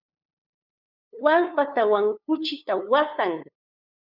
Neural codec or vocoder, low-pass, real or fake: codec, 16 kHz, 2 kbps, FunCodec, trained on LibriTTS, 25 frames a second; 5.4 kHz; fake